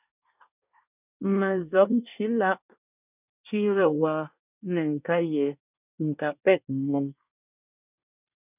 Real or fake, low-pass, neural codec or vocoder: fake; 3.6 kHz; codec, 24 kHz, 1 kbps, SNAC